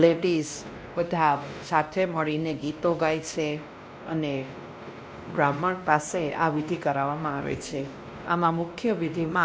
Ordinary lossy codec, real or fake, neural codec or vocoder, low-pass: none; fake; codec, 16 kHz, 1 kbps, X-Codec, WavLM features, trained on Multilingual LibriSpeech; none